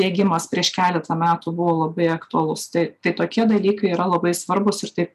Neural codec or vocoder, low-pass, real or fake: none; 14.4 kHz; real